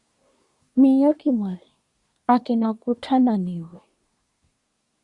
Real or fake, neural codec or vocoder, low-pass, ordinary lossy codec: fake; codec, 24 kHz, 1 kbps, SNAC; 10.8 kHz; Opus, 64 kbps